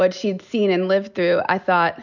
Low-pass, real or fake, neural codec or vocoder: 7.2 kHz; real; none